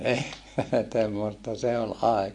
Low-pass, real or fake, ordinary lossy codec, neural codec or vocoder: 10.8 kHz; real; MP3, 48 kbps; none